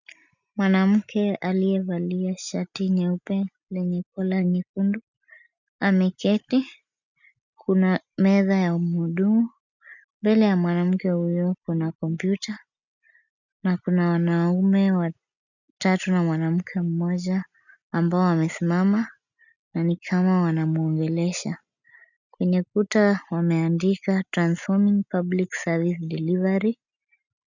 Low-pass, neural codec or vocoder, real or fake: 7.2 kHz; none; real